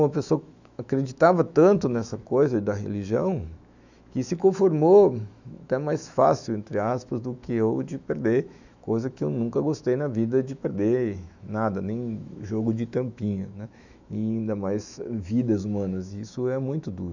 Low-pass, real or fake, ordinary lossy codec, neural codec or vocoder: 7.2 kHz; fake; none; autoencoder, 48 kHz, 128 numbers a frame, DAC-VAE, trained on Japanese speech